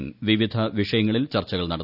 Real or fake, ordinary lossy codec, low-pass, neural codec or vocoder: real; none; 5.4 kHz; none